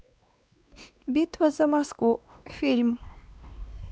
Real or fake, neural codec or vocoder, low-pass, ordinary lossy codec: fake; codec, 16 kHz, 2 kbps, X-Codec, WavLM features, trained on Multilingual LibriSpeech; none; none